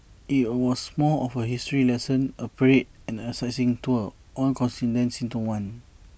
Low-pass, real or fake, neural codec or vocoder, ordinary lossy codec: none; real; none; none